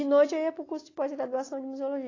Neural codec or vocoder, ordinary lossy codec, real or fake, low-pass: none; AAC, 32 kbps; real; 7.2 kHz